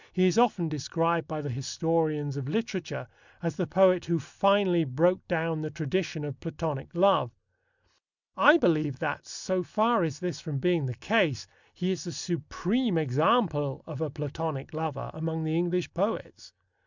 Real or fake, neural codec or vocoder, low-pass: real; none; 7.2 kHz